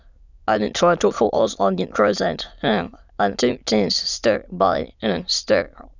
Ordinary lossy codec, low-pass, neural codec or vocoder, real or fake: none; 7.2 kHz; autoencoder, 22.05 kHz, a latent of 192 numbers a frame, VITS, trained on many speakers; fake